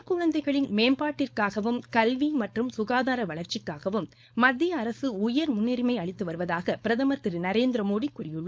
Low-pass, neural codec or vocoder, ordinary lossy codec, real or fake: none; codec, 16 kHz, 4.8 kbps, FACodec; none; fake